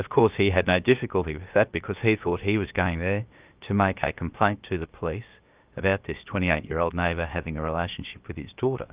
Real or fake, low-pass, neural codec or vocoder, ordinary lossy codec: fake; 3.6 kHz; codec, 16 kHz, about 1 kbps, DyCAST, with the encoder's durations; Opus, 24 kbps